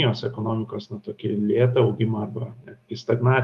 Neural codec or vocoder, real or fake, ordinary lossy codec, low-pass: autoencoder, 48 kHz, 128 numbers a frame, DAC-VAE, trained on Japanese speech; fake; Opus, 32 kbps; 14.4 kHz